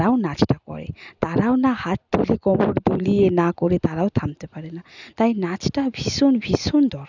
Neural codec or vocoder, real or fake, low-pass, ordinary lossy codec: none; real; 7.2 kHz; none